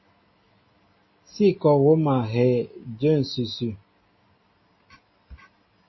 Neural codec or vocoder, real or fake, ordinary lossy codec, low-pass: none; real; MP3, 24 kbps; 7.2 kHz